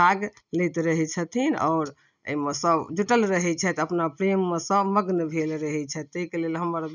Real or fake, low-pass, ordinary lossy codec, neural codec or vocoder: real; 7.2 kHz; none; none